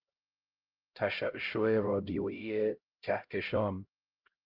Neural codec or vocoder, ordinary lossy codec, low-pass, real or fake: codec, 16 kHz, 0.5 kbps, X-Codec, HuBERT features, trained on LibriSpeech; Opus, 32 kbps; 5.4 kHz; fake